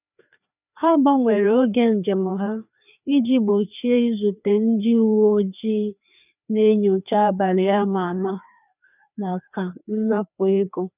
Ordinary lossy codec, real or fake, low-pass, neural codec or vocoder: none; fake; 3.6 kHz; codec, 16 kHz, 2 kbps, FreqCodec, larger model